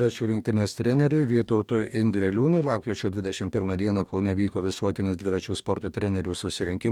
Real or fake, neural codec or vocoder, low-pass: fake; codec, 44.1 kHz, 2.6 kbps, DAC; 19.8 kHz